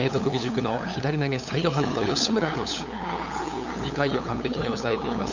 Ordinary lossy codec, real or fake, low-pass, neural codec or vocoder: none; fake; 7.2 kHz; codec, 16 kHz, 8 kbps, FunCodec, trained on LibriTTS, 25 frames a second